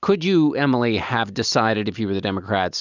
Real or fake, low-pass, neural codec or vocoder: real; 7.2 kHz; none